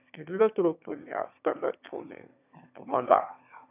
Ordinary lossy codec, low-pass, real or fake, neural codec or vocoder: none; 3.6 kHz; fake; autoencoder, 22.05 kHz, a latent of 192 numbers a frame, VITS, trained on one speaker